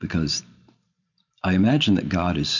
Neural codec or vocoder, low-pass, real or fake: vocoder, 44.1 kHz, 128 mel bands every 512 samples, BigVGAN v2; 7.2 kHz; fake